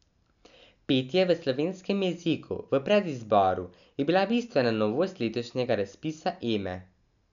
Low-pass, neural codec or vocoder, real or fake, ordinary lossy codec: 7.2 kHz; none; real; none